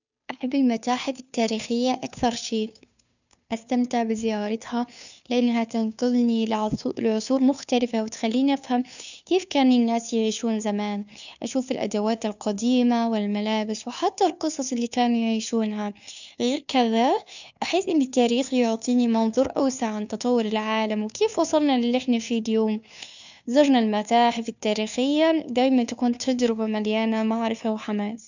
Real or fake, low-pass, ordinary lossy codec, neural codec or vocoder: fake; 7.2 kHz; none; codec, 16 kHz, 2 kbps, FunCodec, trained on Chinese and English, 25 frames a second